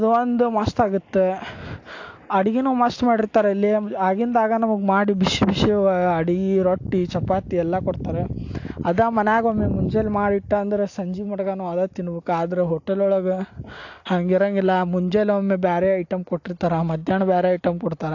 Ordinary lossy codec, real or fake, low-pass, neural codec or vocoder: AAC, 48 kbps; real; 7.2 kHz; none